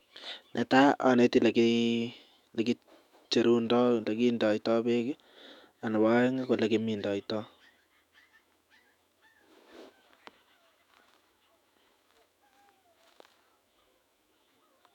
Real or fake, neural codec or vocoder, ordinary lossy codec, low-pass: fake; autoencoder, 48 kHz, 128 numbers a frame, DAC-VAE, trained on Japanese speech; none; 19.8 kHz